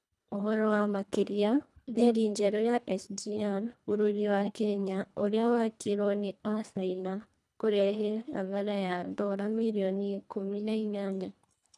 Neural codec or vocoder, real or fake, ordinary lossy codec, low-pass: codec, 24 kHz, 1.5 kbps, HILCodec; fake; none; none